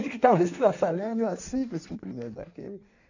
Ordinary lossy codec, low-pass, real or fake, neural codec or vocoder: none; 7.2 kHz; fake; codec, 16 kHz in and 24 kHz out, 1.1 kbps, FireRedTTS-2 codec